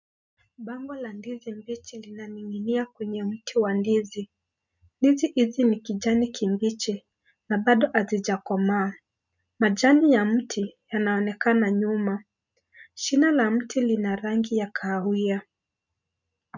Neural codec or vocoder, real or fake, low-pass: none; real; 7.2 kHz